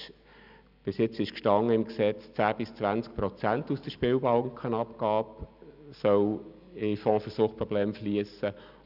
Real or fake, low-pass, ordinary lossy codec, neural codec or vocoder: real; 5.4 kHz; none; none